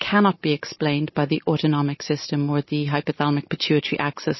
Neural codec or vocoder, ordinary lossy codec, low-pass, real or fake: none; MP3, 24 kbps; 7.2 kHz; real